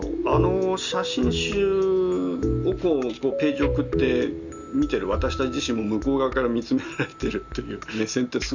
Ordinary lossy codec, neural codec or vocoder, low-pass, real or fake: none; none; 7.2 kHz; real